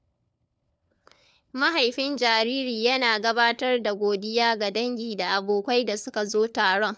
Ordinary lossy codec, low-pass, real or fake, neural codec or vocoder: none; none; fake; codec, 16 kHz, 4 kbps, FunCodec, trained on LibriTTS, 50 frames a second